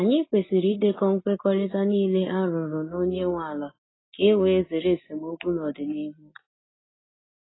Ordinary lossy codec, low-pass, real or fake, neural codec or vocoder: AAC, 16 kbps; 7.2 kHz; real; none